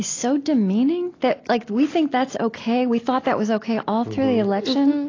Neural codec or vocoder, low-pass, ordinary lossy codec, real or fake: none; 7.2 kHz; AAC, 32 kbps; real